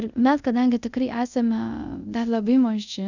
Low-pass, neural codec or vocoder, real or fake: 7.2 kHz; codec, 24 kHz, 0.5 kbps, DualCodec; fake